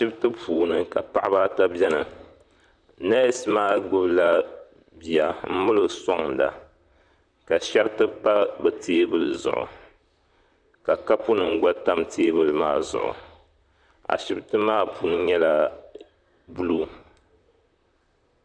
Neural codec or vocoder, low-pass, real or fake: vocoder, 44.1 kHz, 128 mel bands, Pupu-Vocoder; 9.9 kHz; fake